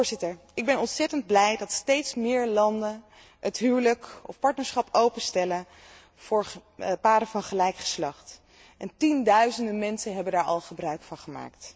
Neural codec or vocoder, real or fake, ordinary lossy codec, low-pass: none; real; none; none